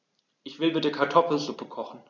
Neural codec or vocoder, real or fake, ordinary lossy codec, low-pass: none; real; none; 7.2 kHz